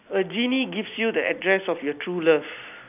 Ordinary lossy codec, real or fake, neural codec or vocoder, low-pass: none; real; none; 3.6 kHz